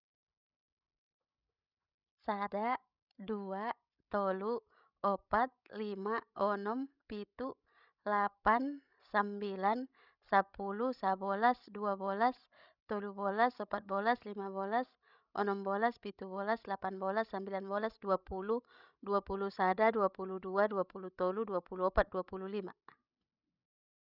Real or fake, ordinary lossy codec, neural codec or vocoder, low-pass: fake; none; codec, 16 kHz, 16 kbps, FreqCodec, larger model; 5.4 kHz